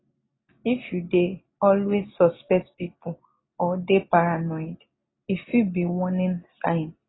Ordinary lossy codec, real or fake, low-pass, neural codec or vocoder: AAC, 16 kbps; real; 7.2 kHz; none